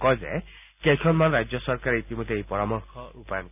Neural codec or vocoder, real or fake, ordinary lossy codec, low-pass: none; real; none; 3.6 kHz